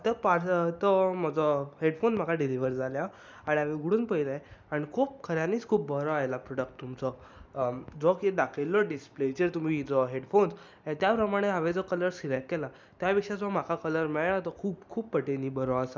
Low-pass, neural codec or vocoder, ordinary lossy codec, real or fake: none; none; none; real